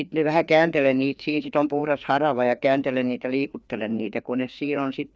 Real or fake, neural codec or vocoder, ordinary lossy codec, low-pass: fake; codec, 16 kHz, 2 kbps, FreqCodec, larger model; none; none